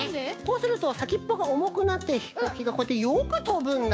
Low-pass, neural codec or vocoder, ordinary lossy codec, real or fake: none; codec, 16 kHz, 6 kbps, DAC; none; fake